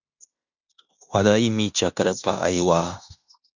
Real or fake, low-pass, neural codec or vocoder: fake; 7.2 kHz; codec, 16 kHz in and 24 kHz out, 0.9 kbps, LongCat-Audio-Codec, fine tuned four codebook decoder